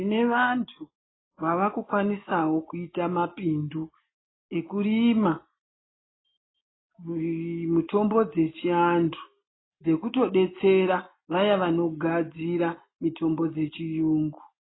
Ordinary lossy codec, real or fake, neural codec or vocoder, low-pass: AAC, 16 kbps; real; none; 7.2 kHz